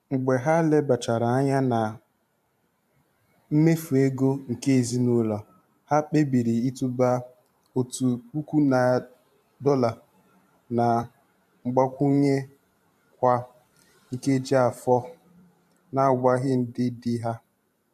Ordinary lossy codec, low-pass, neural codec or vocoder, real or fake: AAC, 96 kbps; 14.4 kHz; vocoder, 44.1 kHz, 128 mel bands every 512 samples, BigVGAN v2; fake